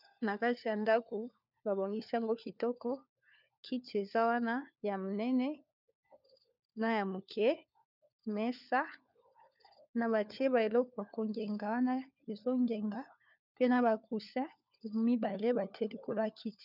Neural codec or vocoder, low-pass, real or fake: codec, 16 kHz, 4 kbps, FunCodec, trained on LibriTTS, 50 frames a second; 5.4 kHz; fake